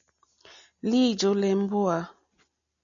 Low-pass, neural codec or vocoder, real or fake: 7.2 kHz; none; real